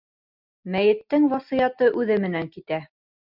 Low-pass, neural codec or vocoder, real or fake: 5.4 kHz; none; real